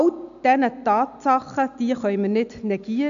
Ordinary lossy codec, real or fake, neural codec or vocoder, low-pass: none; real; none; 7.2 kHz